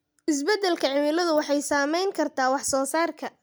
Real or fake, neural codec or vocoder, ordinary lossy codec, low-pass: real; none; none; none